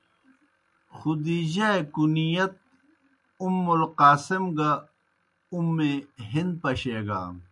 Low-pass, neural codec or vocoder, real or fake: 10.8 kHz; none; real